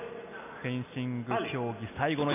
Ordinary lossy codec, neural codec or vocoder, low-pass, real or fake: none; none; 3.6 kHz; real